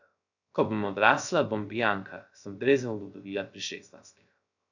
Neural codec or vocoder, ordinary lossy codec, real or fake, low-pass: codec, 16 kHz, 0.3 kbps, FocalCodec; none; fake; 7.2 kHz